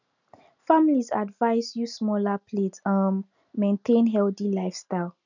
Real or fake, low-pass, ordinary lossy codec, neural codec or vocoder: real; 7.2 kHz; none; none